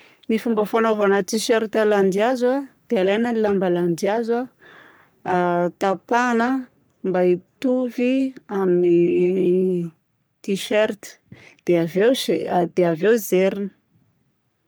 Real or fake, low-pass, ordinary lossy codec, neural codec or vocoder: fake; none; none; codec, 44.1 kHz, 3.4 kbps, Pupu-Codec